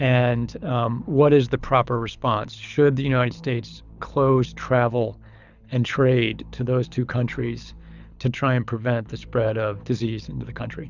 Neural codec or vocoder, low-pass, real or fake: codec, 24 kHz, 6 kbps, HILCodec; 7.2 kHz; fake